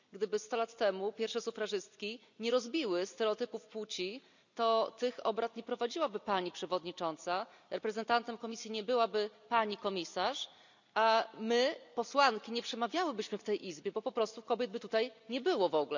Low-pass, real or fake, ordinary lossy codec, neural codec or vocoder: 7.2 kHz; real; none; none